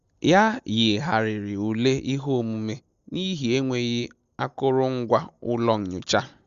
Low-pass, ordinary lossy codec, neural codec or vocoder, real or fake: 7.2 kHz; Opus, 64 kbps; none; real